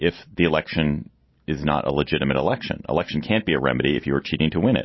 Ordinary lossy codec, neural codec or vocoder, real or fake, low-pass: MP3, 24 kbps; none; real; 7.2 kHz